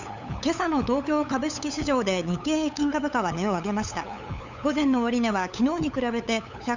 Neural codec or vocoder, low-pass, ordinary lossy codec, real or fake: codec, 16 kHz, 8 kbps, FunCodec, trained on LibriTTS, 25 frames a second; 7.2 kHz; none; fake